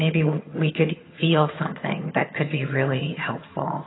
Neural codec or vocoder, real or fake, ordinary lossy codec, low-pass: vocoder, 22.05 kHz, 80 mel bands, HiFi-GAN; fake; AAC, 16 kbps; 7.2 kHz